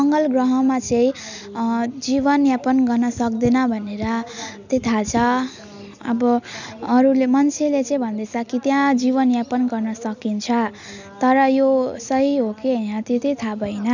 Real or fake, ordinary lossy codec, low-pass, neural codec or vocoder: real; none; 7.2 kHz; none